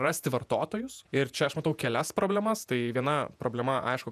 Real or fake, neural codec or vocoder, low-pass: fake; vocoder, 48 kHz, 128 mel bands, Vocos; 14.4 kHz